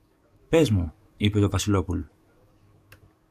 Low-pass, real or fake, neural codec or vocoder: 14.4 kHz; fake; codec, 44.1 kHz, 7.8 kbps, DAC